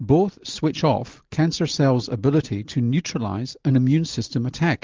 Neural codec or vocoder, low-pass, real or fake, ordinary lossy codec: none; 7.2 kHz; real; Opus, 32 kbps